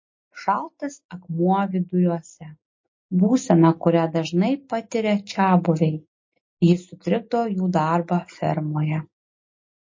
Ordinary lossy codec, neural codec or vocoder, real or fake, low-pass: MP3, 32 kbps; none; real; 7.2 kHz